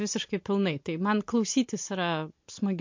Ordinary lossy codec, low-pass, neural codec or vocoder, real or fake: MP3, 48 kbps; 7.2 kHz; none; real